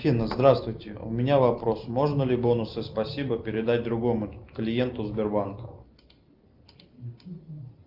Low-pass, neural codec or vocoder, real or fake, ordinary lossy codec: 5.4 kHz; none; real; Opus, 24 kbps